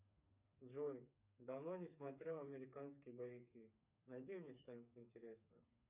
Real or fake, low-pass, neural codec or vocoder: fake; 3.6 kHz; codec, 16 kHz, 4 kbps, FreqCodec, smaller model